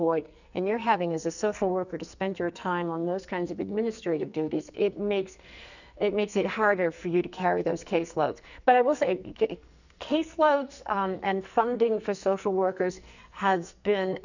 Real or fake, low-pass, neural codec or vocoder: fake; 7.2 kHz; codec, 44.1 kHz, 2.6 kbps, SNAC